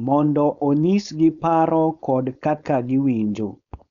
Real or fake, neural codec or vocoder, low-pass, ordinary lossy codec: fake; codec, 16 kHz, 4.8 kbps, FACodec; 7.2 kHz; none